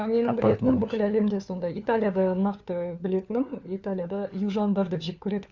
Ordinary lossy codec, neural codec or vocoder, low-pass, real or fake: MP3, 64 kbps; codec, 16 kHz, 4 kbps, FunCodec, trained on LibriTTS, 50 frames a second; 7.2 kHz; fake